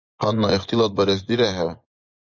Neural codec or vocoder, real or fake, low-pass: vocoder, 24 kHz, 100 mel bands, Vocos; fake; 7.2 kHz